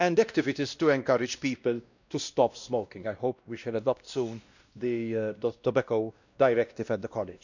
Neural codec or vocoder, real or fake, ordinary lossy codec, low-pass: codec, 16 kHz, 1 kbps, X-Codec, WavLM features, trained on Multilingual LibriSpeech; fake; none; 7.2 kHz